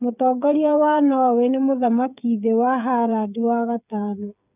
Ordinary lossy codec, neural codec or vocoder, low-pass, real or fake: none; codec, 16 kHz, 8 kbps, FreqCodec, smaller model; 3.6 kHz; fake